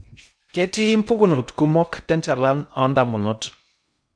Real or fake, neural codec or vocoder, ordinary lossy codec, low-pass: fake; codec, 16 kHz in and 24 kHz out, 0.6 kbps, FocalCodec, streaming, 2048 codes; none; 9.9 kHz